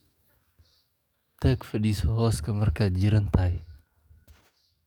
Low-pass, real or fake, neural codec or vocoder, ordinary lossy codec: 19.8 kHz; fake; codec, 44.1 kHz, 7.8 kbps, DAC; none